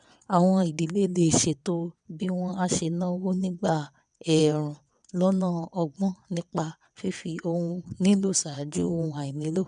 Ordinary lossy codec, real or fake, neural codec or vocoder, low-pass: none; fake; vocoder, 22.05 kHz, 80 mel bands, WaveNeXt; 9.9 kHz